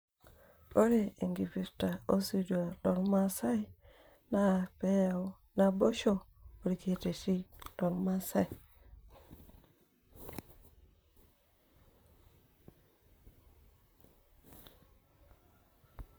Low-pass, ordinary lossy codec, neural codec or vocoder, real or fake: none; none; vocoder, 44.1 kHz, 128 mel bands, Pupu-Vocoder; fake